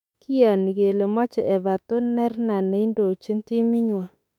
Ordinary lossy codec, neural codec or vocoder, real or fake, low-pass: none; autoencoder, 48 kHz, 32 numbers a frame, DAC-VAE, trained on Japanese speech; fake; 19.8 kHz